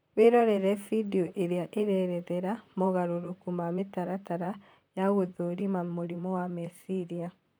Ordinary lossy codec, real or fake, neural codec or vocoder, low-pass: none; fake; vocoder, 44.1 kHz, 128 mel bands, Pupu-Vocoder; none